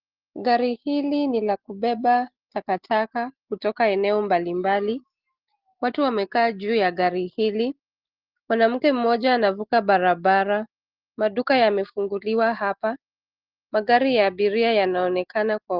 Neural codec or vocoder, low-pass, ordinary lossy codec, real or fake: none; 5.4 kHz; Opus, 16 kbps; real